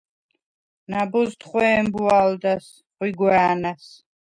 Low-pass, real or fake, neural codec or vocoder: 9.9 kHz; real; none